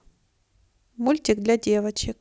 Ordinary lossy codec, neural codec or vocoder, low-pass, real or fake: none; none; none; real